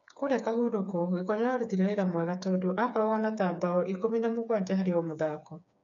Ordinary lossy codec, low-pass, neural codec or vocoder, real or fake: none; 7.2 kHz; codec, 16 kHz, 4 kbps, FreqCodec, smaller model; fake